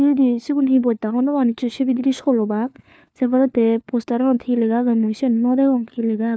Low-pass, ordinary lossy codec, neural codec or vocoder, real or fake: none; none; codec, 16 kHz, 4 kbps, FunCodec, trained on LibriTTS, 50 frames a second; fake